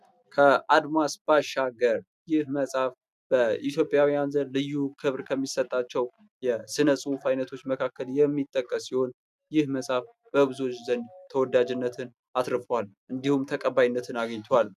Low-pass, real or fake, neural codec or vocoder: 14.4 kHz; real; none